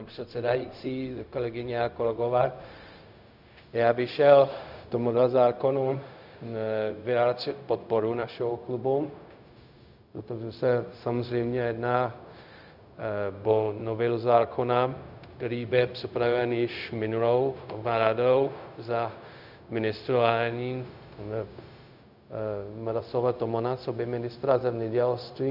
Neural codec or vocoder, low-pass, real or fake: codec, 16 kHz, 0.4 kbps, LongCat-Audio-Codec; 5.4 kHz; fake